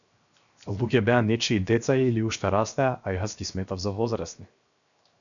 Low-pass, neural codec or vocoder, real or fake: 7.2 kHz; codec, 16 kHz, 0.7 kbps, FocalCodec; fake